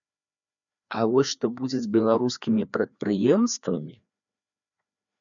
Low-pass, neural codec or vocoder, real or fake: 7.2 kHz; codec, 16 kHz, 2 kbps, FreqCodec, larger model; fake